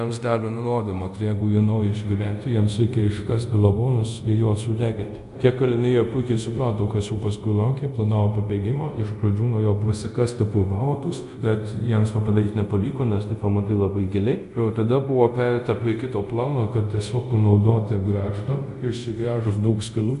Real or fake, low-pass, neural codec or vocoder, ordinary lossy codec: fake; 10.8 kHz; codec, 24 kHz, 0.5 kbps, DualCodec; MP3, 96 kbps